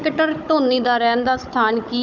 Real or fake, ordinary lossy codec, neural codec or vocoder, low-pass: fake; none; codec, 16 kHz, 16 kbps, FunCodec, trained on Chinese and English, 50 frames a second; 7.2 kHz